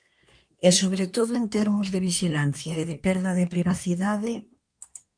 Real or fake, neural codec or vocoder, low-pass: fake; codec, 24 kHz, 1 kbps, SNAC; 9.9 kHz